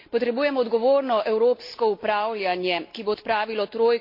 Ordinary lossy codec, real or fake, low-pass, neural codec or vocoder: MP3, 32 kbps; real; 5.4 kHz; none